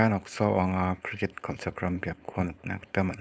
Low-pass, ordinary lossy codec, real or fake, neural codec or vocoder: none; none; fake; codec, 16 kHz, 4.8 kbps, FACodec